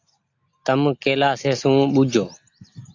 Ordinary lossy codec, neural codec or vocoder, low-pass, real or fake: AAC, 48 kbps; none; 7.2 kHz; real